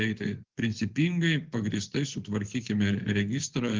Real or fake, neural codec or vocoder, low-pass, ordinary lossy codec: fake; vocoder, 24 kHz, 100 mel bands, Vocos; 7.2 kHz; Opus, 16 kbps